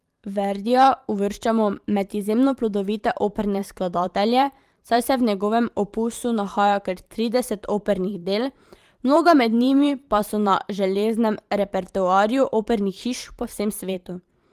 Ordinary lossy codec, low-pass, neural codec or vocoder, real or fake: Opus, 24 kbps; 14.4 kHz; vocoder, 44.1 kHz, 128 mel bands every 512 samples, BigVGAN v2; fake